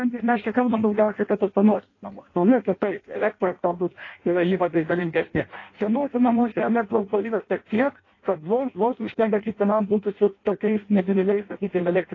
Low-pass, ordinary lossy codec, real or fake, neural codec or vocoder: 7.2 kHz; AAC, 32 kbps; fake; codec, 16 kHz in and 24 kHz out, 0.6 kbps, FireRedTTS-2 codec